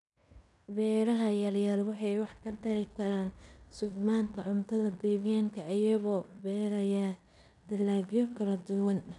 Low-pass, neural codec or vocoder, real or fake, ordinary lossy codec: 10.8 kHz; codec, 16 kHz in and 24 kHz out, 0.9 kbps, LongCat-Audio-Codec, fine tuned four codebook decoder; fake; none